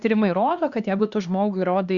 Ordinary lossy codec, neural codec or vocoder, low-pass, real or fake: Opus, 64 kbps; codec, 16 kHz, 2 kbps, X-Codec, HuBERT features, trained on LibriSpeech; 7.2 kHz; fake